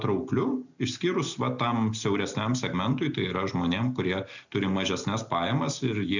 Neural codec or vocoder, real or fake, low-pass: none; real; 7.2 kHz